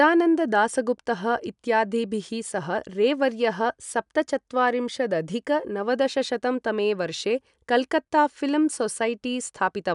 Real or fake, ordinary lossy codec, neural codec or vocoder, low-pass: real; none; none; 10.8 kHz